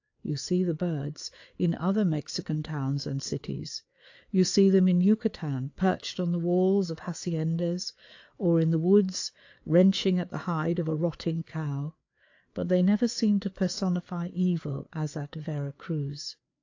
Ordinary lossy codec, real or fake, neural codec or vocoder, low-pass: AAC, 48 kbps; fake; codec, 16 kHz, 4 kbps, FreqCodec, larger model; 7.2 kHz